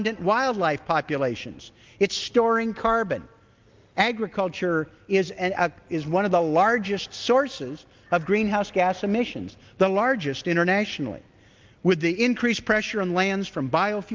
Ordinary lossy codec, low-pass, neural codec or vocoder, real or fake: Opus, 32 kbps; 7.2 kHz; none; real